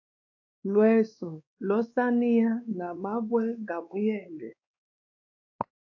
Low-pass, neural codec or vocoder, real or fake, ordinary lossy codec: 7.2 kHz; codec, 16 kHz, 2 kbps, X-Codec, WavLM features, trained on Multilingual LibriSpeech; fake; AAC, 48 kbps